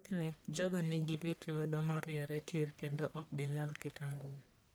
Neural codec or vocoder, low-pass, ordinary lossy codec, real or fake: codec, 44.1 kHz, 1.7 kbps, Pupu-Codec; none; none; fake